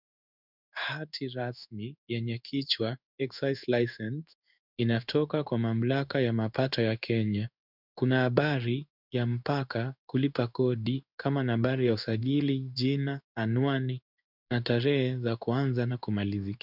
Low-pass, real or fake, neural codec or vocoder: 5.4 kHz; fake; codec, 16 kHz in and 24 kHz out, 1 kbps, XY-Tokenizer